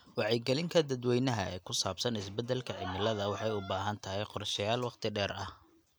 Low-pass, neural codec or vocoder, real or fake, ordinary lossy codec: none; none; real; none